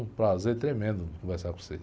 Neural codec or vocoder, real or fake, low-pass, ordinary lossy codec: none; real; none; none